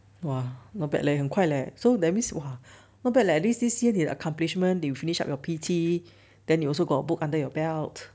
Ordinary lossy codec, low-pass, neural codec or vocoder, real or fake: none; none; none; real